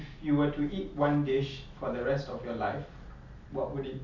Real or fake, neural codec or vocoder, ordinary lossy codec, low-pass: real; none; none; 7.2 kHz